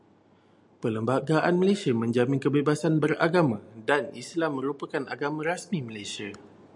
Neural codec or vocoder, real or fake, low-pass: none; real; 10.8 kHz